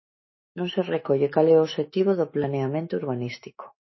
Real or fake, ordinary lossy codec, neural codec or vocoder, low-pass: fake; MP3, 24 kbps; autoencoder, 48 kHz, 128 numbers a frame, DAC-VAE, trained on Japanese speech; 7.2 kHz